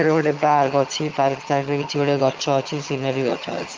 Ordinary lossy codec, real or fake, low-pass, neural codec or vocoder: Opus, 32 kbps; fake; 7.2 kHz; vocoder, 22.05 kHz, 80 mel bands, HiFi-GAN